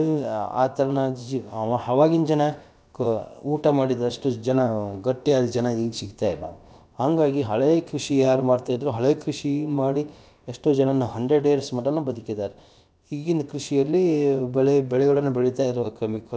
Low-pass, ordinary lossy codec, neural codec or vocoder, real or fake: none; none; codec, 16 kHz, about 1 kbps, DyCAST, with the encoder's durations; fake